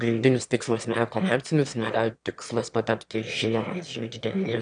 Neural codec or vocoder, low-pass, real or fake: autoencoder, 22.05 kHz, a latent of 192 numbers a frame, VITS, trained on one speaker; 9.9 kHz; fake